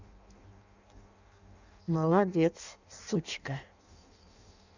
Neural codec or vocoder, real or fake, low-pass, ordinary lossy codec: codec, 16 kHz in and 24 kHz out, 0.6 kbps, FireRedTTS-2 codec; fake; 7.2 kHz; none